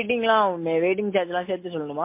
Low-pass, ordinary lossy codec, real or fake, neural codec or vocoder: 3.6 kHz; MP3, 24 kbps; real; none